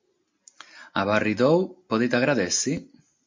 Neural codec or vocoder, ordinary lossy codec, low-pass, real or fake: none; MP3, 48 kbps; 7.2 kHz; real